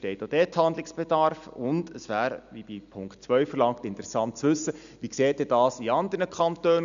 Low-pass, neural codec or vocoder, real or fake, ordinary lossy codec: 7.2 kHz; none; real; none